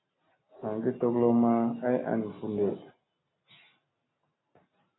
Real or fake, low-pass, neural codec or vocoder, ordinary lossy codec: real; 7.2 kHz; none; AAC, 16 kbps